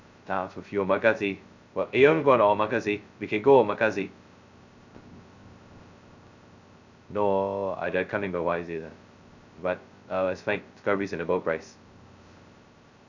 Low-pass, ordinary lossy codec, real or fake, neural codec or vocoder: 7.2 kHz; none; fake; codec, 16 kHz, 0.2 kbps, FocalCodec